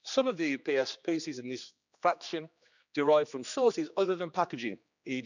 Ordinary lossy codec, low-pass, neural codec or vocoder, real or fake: none; 7.2 kHz; codec, 16 kHz, 2 kbps, X-Codec, HuBERT features, trained on general audio; fake